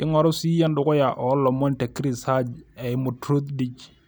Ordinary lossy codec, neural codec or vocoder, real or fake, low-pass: none; none; real; none